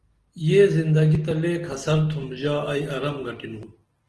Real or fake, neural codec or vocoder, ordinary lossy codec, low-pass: real; none; Opus, 16 kbps; 10.8 kHz